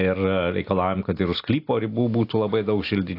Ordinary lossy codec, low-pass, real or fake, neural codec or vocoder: AAC, 32 kbps; 5.4 kHz; real; none